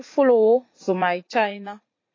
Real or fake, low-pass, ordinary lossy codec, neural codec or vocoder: fake; 7.2 kHz; AAC, 32 kbps; vocoder, 44.1 kHz, 80 mel bands, Vocos